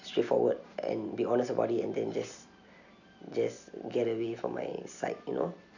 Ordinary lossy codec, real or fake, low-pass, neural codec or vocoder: none; real; 7.2 kHz; none